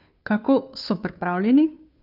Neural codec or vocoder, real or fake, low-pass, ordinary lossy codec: codec, 16 kHz, 2 kbps, FunCodec, trained on Chinese and English, 25 frames a second; fake; 5.4 kHz; none